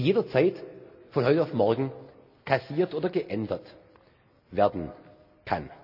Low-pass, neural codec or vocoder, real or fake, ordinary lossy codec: 5.4 kHz; none; real; none